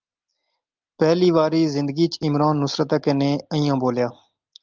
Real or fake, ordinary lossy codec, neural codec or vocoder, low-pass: real; Opus, 32 kbps; none; 7.2 kHz